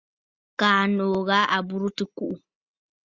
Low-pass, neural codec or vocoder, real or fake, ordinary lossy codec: 7.2 kHz; none; real; Opus, 64 kbps